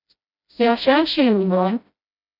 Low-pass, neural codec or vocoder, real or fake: 5.4 kHz; codec, 16 kHz, 0.5 kbps, FreqCodec, smaller model; fake